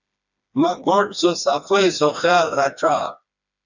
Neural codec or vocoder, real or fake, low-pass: codec, 16 kHz, 2 kbps, FreqCodec, smaller model; fake; 7.2 kHz